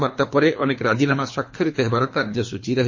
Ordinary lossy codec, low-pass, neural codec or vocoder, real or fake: MP3, 32 kbps; 7.2 kHz; codec, 24 kHz, 3 kbps, HILCodec; fake